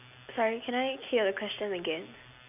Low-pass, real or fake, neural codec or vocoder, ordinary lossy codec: 3.6 kHz; real; none; none